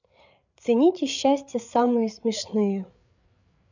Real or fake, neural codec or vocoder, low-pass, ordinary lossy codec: fake; codec, 16 kHz, 8 kbps, FreqCodec, larger model; 7.2 kHz; none